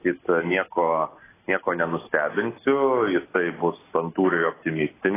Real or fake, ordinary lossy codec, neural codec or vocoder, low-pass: real; AAC, 16 kbps; none; 3.6 kHz